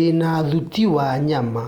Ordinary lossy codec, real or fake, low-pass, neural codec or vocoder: Opus, 32 kbps; real; 19.8 kHz; none